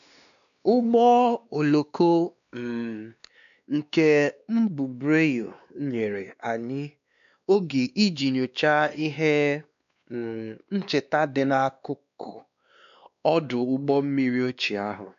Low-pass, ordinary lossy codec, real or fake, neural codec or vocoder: 7.2 kHz; none; fake; codec, 16 kHz, 2 kbps, X-Codec, WavLM features, trained on Multilingual LibriSpeech